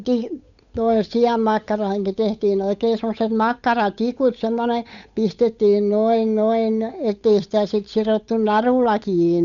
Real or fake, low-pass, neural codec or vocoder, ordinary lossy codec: fake; 7.2 kHz; codec, 16 kHz, 8 kbps, FunCodec, trained on Chinese and English, 25 frames a second; none